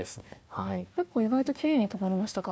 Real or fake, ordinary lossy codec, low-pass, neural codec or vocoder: fake; none; none; codec, 16 kHz, 1 kbps, FunCodec, trained on Chinese and English, 50 frames a second